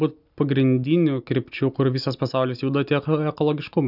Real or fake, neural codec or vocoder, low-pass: real; none; 5.4 kHz